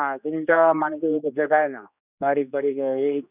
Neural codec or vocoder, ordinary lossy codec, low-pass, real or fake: codec, 16 kHz, 2 kbps, X-Codec, HuBERT features, trained on general audio; none; 3.6 kHz; fake